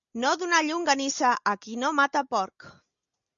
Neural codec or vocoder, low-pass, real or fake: none; 7.2 kHz; real